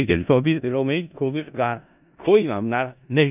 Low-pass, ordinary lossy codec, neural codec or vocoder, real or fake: 3.6 kHz; none; codec, 16 kHz in and 24 kHz out, 0.4 kbps, LongCat-Audio-Codec, four codebook decoder; fake